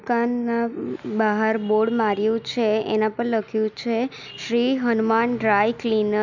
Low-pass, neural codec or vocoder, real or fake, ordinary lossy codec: 7.2 kHz; none; real; none